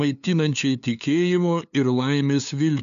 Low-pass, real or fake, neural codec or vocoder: 7.2 kHz; fake; codec, 16 kHz, 2 kbps, FunCodec, trained on LibriTTS, 25 frames a second